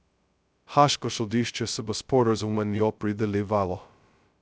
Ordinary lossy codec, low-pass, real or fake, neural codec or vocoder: none; none; fake; codec, 16 kHz, 0.2 kbps, FocalCodec